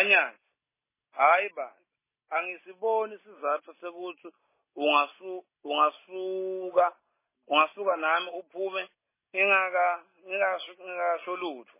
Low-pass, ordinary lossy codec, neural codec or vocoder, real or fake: 3.6 kHz; MP3, 16 kbps; none; real